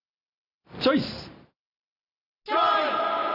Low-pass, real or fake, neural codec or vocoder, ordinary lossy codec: 5.4 kHz; real; none; none